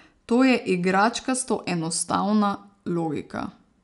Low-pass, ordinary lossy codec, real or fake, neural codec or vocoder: 10.8 kHz; none; real; none